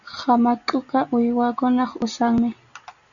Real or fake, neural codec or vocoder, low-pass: real; none; 7.2 kHz